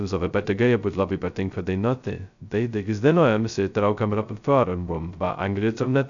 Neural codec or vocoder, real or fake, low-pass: codec, 16 kHz, 0.2 kbps, FocalCodec; fake; 7.2 kHz